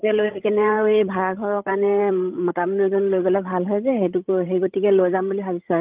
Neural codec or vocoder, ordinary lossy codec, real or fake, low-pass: codec, 16 kHz, 16 kbps, FreqCodec, larger model; Opus, 32 kbps; fake; 3.6 kHz